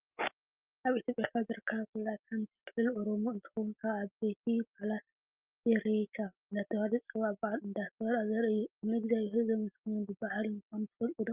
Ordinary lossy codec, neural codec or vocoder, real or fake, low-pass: Opus, 24 kbps; none; real; 3.6 kHz